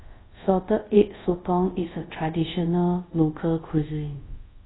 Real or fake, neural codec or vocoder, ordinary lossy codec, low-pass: fake; codec, 24 kHz, 0.5 kbps, DualCodec; AAC, 16 kbps; 7.2 kHz